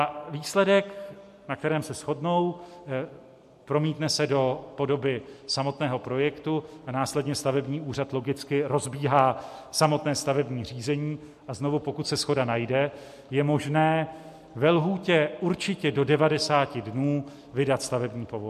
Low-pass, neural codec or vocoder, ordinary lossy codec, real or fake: 14.4 kHz; none; MP3, 64 kbps; real